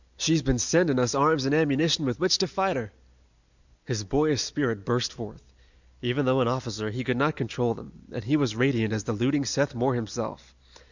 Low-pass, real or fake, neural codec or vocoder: 7.2 kHz; real; none